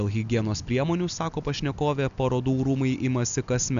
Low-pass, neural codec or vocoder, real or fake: 7.2 kHz; none; real